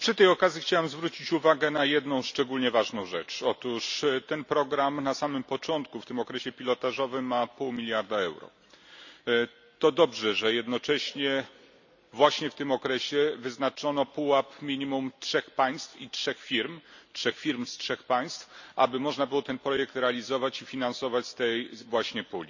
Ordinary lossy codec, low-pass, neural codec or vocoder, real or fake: none; 7.2 kHz; none; real